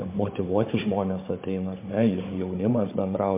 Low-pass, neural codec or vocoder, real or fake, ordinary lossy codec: 3.6 kHz; codec, 16 kHz, 16 kbps, FunCodec, trained on Chinese and English, 50 frames a second; fake; MP3, 24 kbps